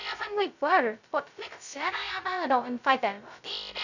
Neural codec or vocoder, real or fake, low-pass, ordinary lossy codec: codec, 16 kHz, 0.2 kbps, FocalCodec; fake; 7.2 kHz; none